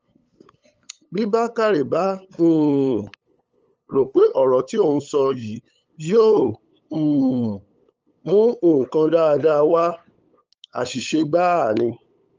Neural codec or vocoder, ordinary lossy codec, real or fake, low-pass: codec, 16 kHz, 8 kbps, FunCodec, trained on LibriTTS, 25 frames a second; Opus, 24 kbps; fake; 7.2 kHz